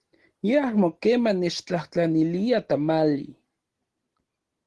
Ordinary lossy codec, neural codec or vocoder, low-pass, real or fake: Opus, 16 kbps; none; 10.8 kHz; real